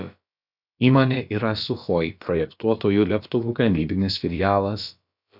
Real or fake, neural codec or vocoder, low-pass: fake; codec, 16 kHz, about 1 kbps, DyCAST, with the encoder's durations; 5.4 kHz